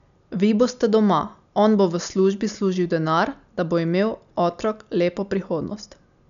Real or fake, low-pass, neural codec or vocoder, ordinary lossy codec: real; 7.2 kHz; none; none